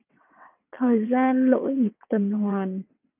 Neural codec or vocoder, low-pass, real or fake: vocoder, 22.05 kHz, 80 mel bands, WaveNeXt; 3.6 kHz; fake